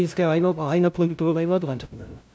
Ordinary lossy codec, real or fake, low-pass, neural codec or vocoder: none; fake; none; codec, 16 kHz, 0.5 kbps, FunCodec, trained on LibriTTS, 25 frames a second